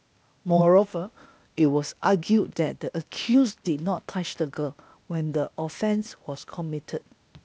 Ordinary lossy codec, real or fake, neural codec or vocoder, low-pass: none; fake; codec, 16 kHz, 0.8 kbps, ZipCodec; none